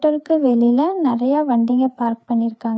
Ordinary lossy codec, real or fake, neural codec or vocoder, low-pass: none; fake; codec, 16 kHz, 4 kbps, FreqCodec, larger model; none